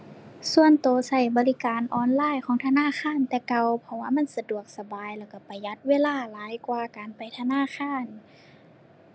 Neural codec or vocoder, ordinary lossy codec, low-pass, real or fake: none; none; none; real